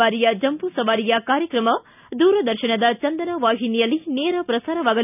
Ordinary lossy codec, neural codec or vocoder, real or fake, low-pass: none; none; real; 3.6 kHz